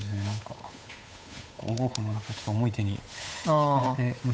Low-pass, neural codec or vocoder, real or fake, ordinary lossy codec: none; codec, 16 kHz, 8 kbps, FunCodec, trained on Chinese and English, 25 frames a second; fake; none